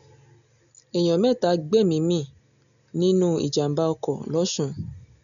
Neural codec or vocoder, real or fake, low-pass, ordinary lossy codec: none; real; 7.2 kHz; none